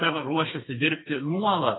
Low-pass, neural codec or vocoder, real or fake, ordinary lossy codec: 7.2 kHz; codec, 32 kHz, 1.9 kbps, SNAC; fake; AAC, 16 kbps